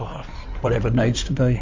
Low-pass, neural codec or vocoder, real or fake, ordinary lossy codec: 7.2 kHz; codec, 16 kHz in and 24 kHz out, 2.2 kbps, FireRedTTS-2 codec; fake; MP3, 48 kbps